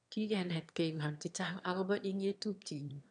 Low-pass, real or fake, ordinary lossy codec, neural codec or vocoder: 9.9 kHz; fake; none; autoencoder, 22.05 kHz, a latent of 192 numbers a frame, VITS, trained on one speaker